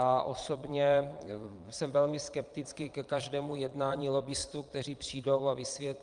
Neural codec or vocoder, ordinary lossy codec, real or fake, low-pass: vocoder, 22.05 kHz, 80 mel bands, Vocos; Opus, 32 kbps; fake; 9.9 kHz